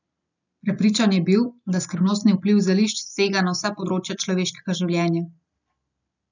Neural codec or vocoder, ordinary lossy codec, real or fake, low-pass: none; none; real; 7.2 kHz